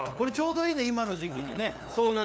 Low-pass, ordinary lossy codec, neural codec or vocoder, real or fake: none; none; codec, 16 kHz, 2 kbps, FunCodec, trained on LibriTTS, 25 frames a second; fake